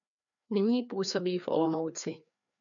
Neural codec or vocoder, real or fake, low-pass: codec, 16 kHz, 2 kbps, FreqCodec, larger model; fake; 7.2 kHz